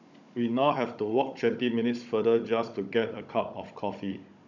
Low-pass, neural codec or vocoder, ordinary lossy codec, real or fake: 7.2 kHz; codec, 16 kHz, 16 kbps, FunCodec, trained on Chinese and English, 50 frames a second; none; fake